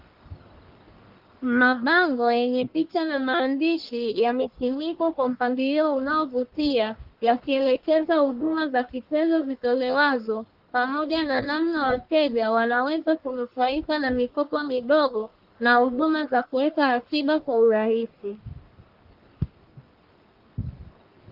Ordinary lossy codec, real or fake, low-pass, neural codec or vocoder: Opus, 32 kbps; fake; 5.4 kHz; codec, 44.1 kHz, 1.7 kbps, Pupu-Codec